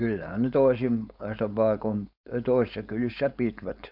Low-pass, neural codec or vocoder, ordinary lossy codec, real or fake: 5.4 kHz; codec, 16 kHz, 4.8 kbps, FACodec; none; fake